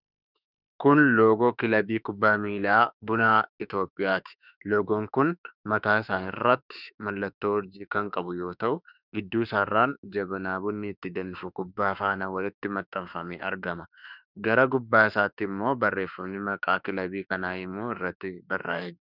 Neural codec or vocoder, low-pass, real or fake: autoencoder, 48 kHz, 32 numbers a frame, DAC-VAE, trained on Japanese speech; 5.4 kHz; fake